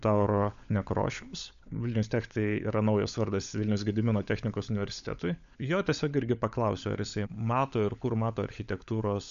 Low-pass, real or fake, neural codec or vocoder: 7.2 kHz; fake; codec, 16 kHz, 16 kbps, FunCodec, trained on LibriTTS, 50 frames a second